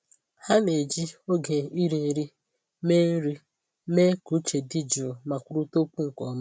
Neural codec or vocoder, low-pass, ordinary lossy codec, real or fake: none; none; none; real